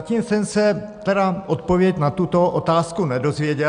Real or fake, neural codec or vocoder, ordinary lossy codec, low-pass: real; none; MP3, 96 kbps; 9.9 kHz